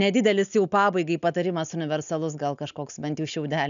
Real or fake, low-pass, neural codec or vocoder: real; 7.2 kHz; none